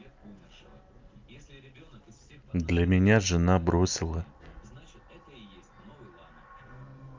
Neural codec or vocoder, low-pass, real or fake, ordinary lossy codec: none; 7.2 kHz; real; Opus, 32 kbps